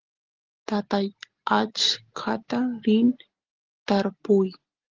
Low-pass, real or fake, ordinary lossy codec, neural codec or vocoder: 7.2 kHz; real; Opus, 16 kbps; none